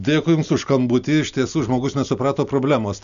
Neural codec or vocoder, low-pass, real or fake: none; 7.2 kHz; real